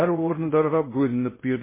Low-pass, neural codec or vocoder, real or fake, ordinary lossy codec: 3.6 kHz; codec, 16 kHz in and 24 kHz out, 0.6 kbps, FocalCodec, streaming, 2048 codes; fake; MP3, 16 kbps